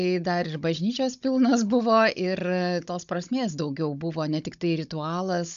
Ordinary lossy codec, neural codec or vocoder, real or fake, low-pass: AAC, 96 kbps; codec, 16 kHz, 16 kbps, FunCodec, trained on Chinese and English, 50 frames a second; fake; 7.2 kHz